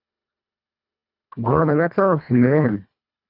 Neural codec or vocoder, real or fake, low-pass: codec, 24 kHz, 1.5 kbps, HILCodec; fake; 5.4 kHz